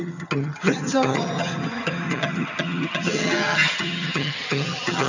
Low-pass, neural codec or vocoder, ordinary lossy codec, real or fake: 7.2 kHz; vocoder, 22.05 kHz, 80 mel bands, HiFi-GAN; none; fake